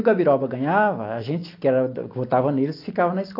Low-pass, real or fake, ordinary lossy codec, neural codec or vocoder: 5.4 kHz; real; AAC, 32 kbps; none